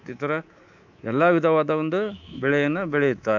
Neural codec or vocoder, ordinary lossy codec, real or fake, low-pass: codec, 24 kHz, 3.1 kbps, DualCodec; none; fake; 7.2 kHz